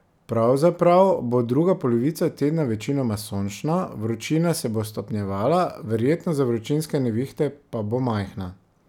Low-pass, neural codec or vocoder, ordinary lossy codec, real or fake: 19.8 kHz; none; none; real